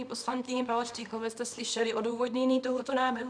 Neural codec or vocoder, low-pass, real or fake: codec, 24 kHz, 0.9 kbps, WavTokenizer, small release; 9.9 kHz; fake